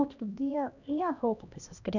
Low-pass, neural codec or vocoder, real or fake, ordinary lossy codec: 7.2 kHz; codec, 16 kHz, 1 kbps, X-Codec, HuBERT features, trained on LibriSpeech; fake; none